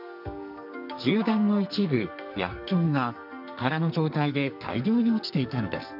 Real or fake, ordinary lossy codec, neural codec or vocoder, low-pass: fake; none; codec, 44.1 kHz, 2.6 kbps, SNAC; 5.4 kHz